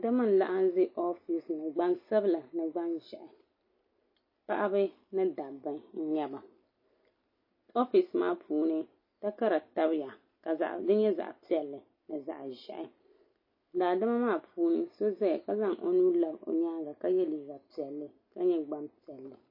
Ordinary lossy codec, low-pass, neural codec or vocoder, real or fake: MP3, 24 kbps; 5.4 kHz; none; real